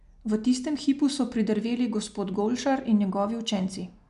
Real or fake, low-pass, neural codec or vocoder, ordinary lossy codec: real; 10.8 kHz; none; none